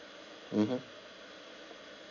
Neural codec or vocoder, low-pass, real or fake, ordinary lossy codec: none; 7.2 kHz; real; none